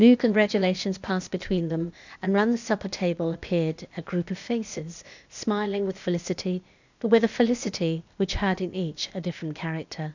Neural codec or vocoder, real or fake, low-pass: codec, 16 kHz, 0.8 kbps, ZipCodec; fake; 7.2 kHz